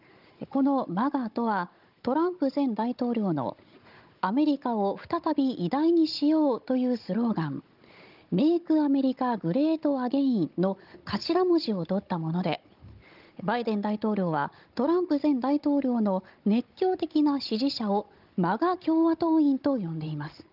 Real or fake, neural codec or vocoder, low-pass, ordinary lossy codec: fake; codec, 16 kHz, 16 kbps, FunCodec, trained on Chinese and English, 50 frames a second; 5.4 kHz; Opus, 24 kbps